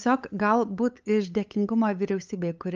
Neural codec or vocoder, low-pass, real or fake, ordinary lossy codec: codec, 16 kHz, 8 kbps, FunCodec, trained on LibriTTS, 25 frames a second; 7.2 kHz; fake; Opus, 24 kbps